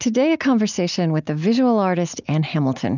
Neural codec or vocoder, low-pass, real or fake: none; 7.2 kHz; real